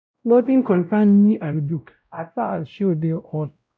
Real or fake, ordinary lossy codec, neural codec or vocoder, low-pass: fake; none; codec, 16 kHz, 0.5 kbps, X-Codec, WavLM features, trained on Multilingual LibriSpeech; none